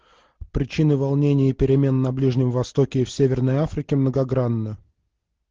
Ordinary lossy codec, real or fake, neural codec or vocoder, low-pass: Opus, 16 kbps; real; none; 7.2 kHz